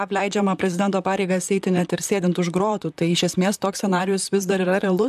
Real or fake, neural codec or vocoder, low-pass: fake; vocoder, 44.1 kHz, 128 mel bands, Pupu-Vocoder; 14.4 kHz